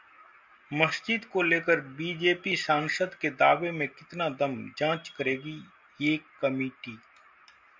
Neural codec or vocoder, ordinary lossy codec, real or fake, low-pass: none; MP3, 64 kbps; real; 7.2 kHz